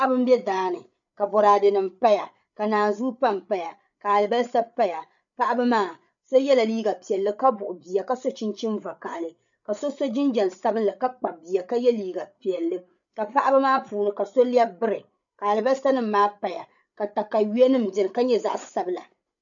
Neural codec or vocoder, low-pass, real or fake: codec, 16 kHz, 8 kbps, FreqCodec, larger model; 7.2 kHz; fake